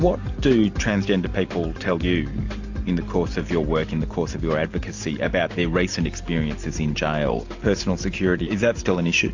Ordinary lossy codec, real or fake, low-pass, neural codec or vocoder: AAC, 48 kbps; real; 7.2 kHz; none